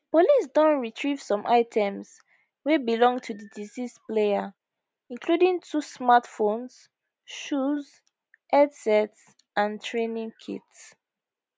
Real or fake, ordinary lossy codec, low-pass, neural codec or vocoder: real; none; none; none